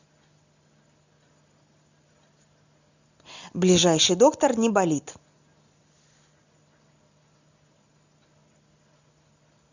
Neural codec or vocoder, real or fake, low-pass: none; real; 7.2 kHz